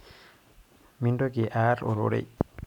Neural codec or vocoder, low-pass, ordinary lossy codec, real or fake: none; 19.8 kHz; none; real